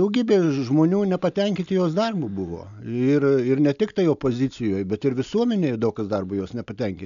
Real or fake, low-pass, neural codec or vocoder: real; 7.2 kHz; none